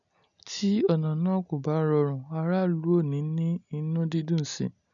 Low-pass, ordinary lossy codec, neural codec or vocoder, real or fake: 7.2 kHz; none; none; real